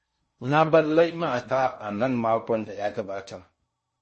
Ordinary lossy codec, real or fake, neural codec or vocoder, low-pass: MP3, 32 kbps; fake; codec, 16 kHz in and 24 kHz out, 0.6 kbps, FocalCodec, streaming, 4096 codes; 10.8 kHz